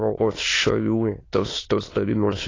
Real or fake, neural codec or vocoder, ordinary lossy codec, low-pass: fake; autoencoder, 22.05 kHz, a latent of 192 numbers a frame, VITS, trained on many speakers; AAC, 32 kbps; 7.2 kHz